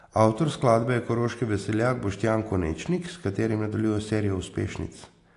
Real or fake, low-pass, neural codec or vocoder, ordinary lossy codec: real; 10.8 kHz; none; AAC, 48 kbps